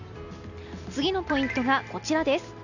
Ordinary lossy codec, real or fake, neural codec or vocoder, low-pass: none; real; none; 7.2 kHz